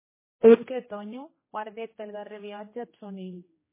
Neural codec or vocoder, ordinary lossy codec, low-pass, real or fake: codec, 16 kHz, 1 kbps, X-Codec, HuBERT features, trained on general audio; MP3, 16 kbps; 3.6 kHz; fake